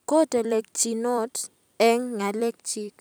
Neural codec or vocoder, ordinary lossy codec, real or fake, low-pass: vocoder, 44.1 kHz, 128 mel bands every 256 samples, BigVGAN v2; none; fake; none